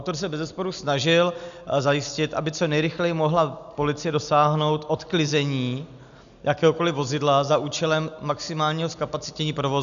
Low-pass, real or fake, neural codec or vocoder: 7.2 kHz; real; none